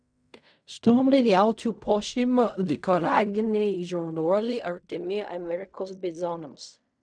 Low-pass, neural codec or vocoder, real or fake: 9.9 kHz; codec, 16 kHz in and 24 kHz out, 0.4 kbps, LongCat-Audio-Codec, fine tuned four codebook decoder; fake